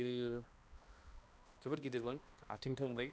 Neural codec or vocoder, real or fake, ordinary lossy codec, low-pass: codec, 16 kHz, 1 kbps, X-Codec, HuBERT features, trained on balanced general audio; fake; none; none